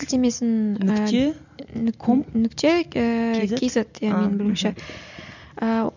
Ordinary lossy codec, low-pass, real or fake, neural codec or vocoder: none; 7.2 kHz; real; none